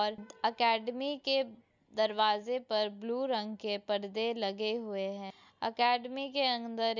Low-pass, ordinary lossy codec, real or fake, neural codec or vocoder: 7.2 kHz; none; real; none